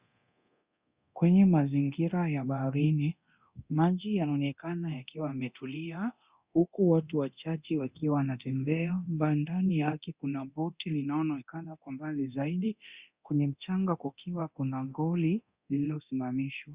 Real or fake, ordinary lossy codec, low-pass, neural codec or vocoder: fake; Opus, 64 kbps; 3.6 kHz; codec, 24 kHz, 0.9 kbps, DualCodec